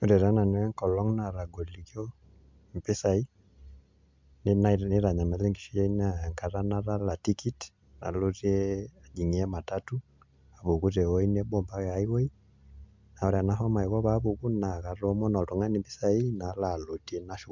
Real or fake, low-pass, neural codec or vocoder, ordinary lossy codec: real; 7.2 kHz; none; MP3, 64 kbps